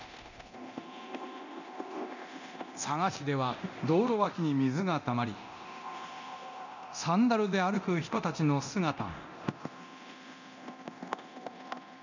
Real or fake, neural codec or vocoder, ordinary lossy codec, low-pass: fake; codec, 24 kHz, 0.9 kbps, DualCodec; none; 7.2 kHz